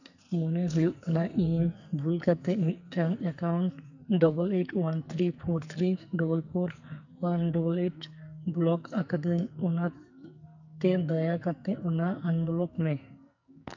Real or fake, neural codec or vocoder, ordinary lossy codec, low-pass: fake; codec, 44.1 kHz, 2.6 kbps, SNAC; none; 7.2 kHz